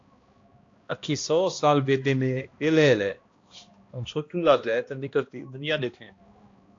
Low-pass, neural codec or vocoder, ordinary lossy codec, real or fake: 7.2 kHz; codec, 16 kHz, 1 kbps, X-Codec, HuBERT features, trained on balanced general audio; AAC, 48 kbps; fake